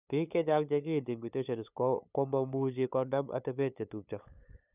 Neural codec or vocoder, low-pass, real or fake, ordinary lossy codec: codec, 16 kHz, 8 kbps, FunCodec, trained on LibriTTS, 25 frames a second; 3.6 kHz; fake; none